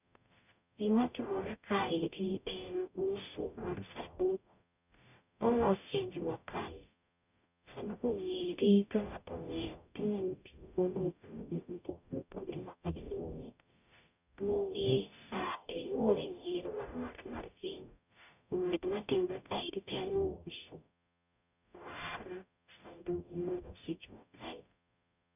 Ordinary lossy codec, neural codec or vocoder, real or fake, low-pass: none; codec, 44.1 kHz, 0.9 kbps, DAC; fake; 3.6 kHz